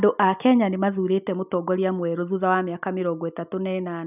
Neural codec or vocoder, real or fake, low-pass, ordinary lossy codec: none; real; 3.6 kHz; none